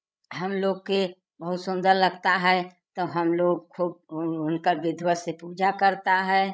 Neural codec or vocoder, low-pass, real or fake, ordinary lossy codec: codec, 16 kHz, 16 kbps, FreqCodec, larger model; none; fake; none